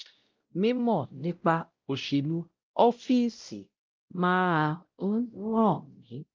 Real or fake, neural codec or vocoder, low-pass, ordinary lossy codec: fake; codec, 16 kHz, 0.5 kbps, X-Codec, WavLM features, trained on Multilingual LibriSpeech; 7.2 kHz; Opus, 24 kbps